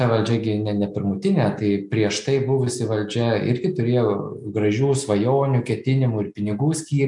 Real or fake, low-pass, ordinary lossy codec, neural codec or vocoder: real; 10.8 kHz; MP3, 96 kbps; none